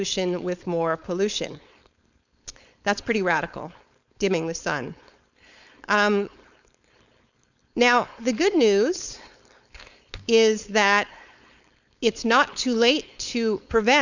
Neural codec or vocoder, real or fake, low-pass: codec, 16 kHz, 4.8 kbps, FACodec; fake; 7.2 kHz